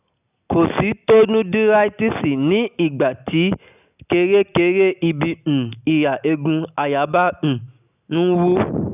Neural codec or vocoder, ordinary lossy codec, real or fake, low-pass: none; none; real; 3.6 kHz